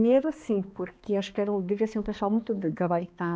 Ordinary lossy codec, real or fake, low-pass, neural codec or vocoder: none; fake; none; codec, 16 kHz, 2 kbps, X-Codec, HuBERT features, trained on balanced general audio